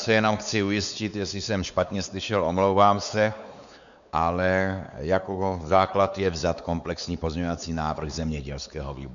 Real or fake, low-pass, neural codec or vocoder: fake; 7.2 kHz; codec, 16 kHz, 4 kbps, X-Codec, WavLM features, trained on Multilingual LibriSpeech